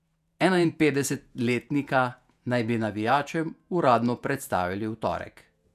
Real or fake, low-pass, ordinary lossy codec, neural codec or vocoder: fake; 14.4 kHz; none; vocoder, 48 kHz, 128 mel bands, Vocos